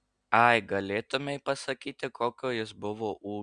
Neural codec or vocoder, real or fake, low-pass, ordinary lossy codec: none; real; 9.9 kHz; Opus, 64 kbps